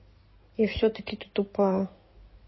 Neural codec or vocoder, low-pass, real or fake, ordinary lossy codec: codec, 16 kHz in and 24 kHz out, 2.2 kbps, FireRedTTS-2 codec; 7.2 kHz; fake; MP3, 24 kbps